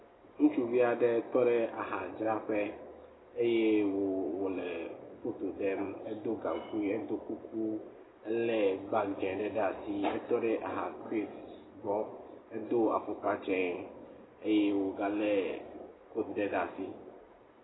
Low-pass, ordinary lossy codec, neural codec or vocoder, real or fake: 7.2 kHz; AAC, 16 kbps; none; real